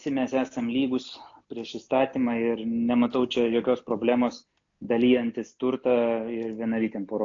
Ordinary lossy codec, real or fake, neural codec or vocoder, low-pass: AAC, 48 kbps; real; none; 7.2 kHz